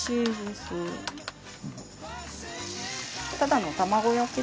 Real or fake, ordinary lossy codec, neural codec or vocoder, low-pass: real; none; none; none